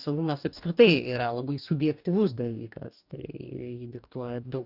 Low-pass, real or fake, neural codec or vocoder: 5.4 kHz; fake; codec, 44.1 kHz, 2.6 kbps, DAC